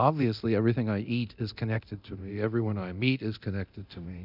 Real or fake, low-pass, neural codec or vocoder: fake; 5.4 kHz; codec, 24 kHz, 0.9 kbps, DualCodec